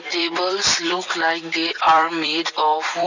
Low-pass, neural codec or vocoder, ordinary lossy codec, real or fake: 7.2 kHz; vocoder, 44.1 kHz, 128 mel bands every 256 samples, BigVGAN v2; none; fake